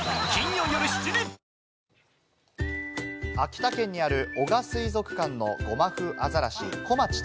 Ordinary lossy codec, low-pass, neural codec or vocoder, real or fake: none; none; none; real